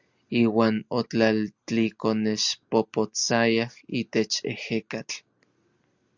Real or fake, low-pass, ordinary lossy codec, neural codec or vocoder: real; 7.2 kHz; Opus, 64 kbps; none